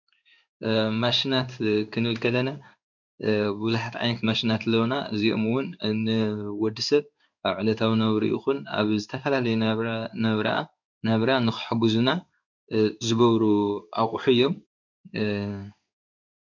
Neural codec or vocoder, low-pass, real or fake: codec, 16 kHz in and 24 kHz out, 1 kbps, XY-Tokenizer; 7.2 kHz; fake